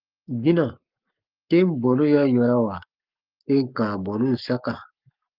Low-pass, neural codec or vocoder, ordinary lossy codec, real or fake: 5.4 kHz; codec, 44.1 kHz, 7.8 kbps, Pupu-Codec; Opus, 32 kbps; fake